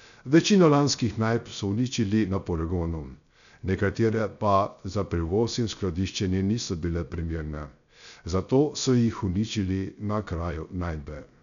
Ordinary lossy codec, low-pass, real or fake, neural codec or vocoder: none; 7.2 kHz; fake; codec, 16 kHz, 0.3 kbps, FocalCodec